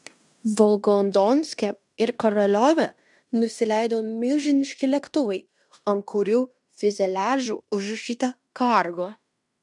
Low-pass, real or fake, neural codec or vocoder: 10.8 kHz; fake; codec, 16 kHz in and 24 kHz out, 0.9 kbps, LongCat-Audio-Codec, fine tuned four codebook decoder